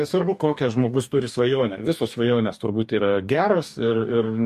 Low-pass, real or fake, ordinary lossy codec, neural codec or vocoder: 14.4 kHz; fake; MP3, 64 kbps; codec, 44.1 kHz, 2.6 kbps, DAC